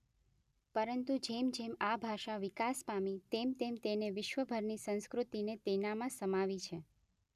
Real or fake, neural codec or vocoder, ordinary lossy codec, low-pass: real; none; none; 14.4 kHz